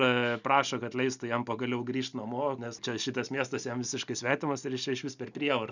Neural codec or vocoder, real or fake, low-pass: none; real; 7.2 kHz